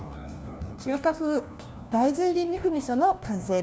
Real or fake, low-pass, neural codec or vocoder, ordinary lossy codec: fake; none; codec, 16 kHz, 1 kbps, FunCodec, trained on LibriTTS, 50 frames a second; none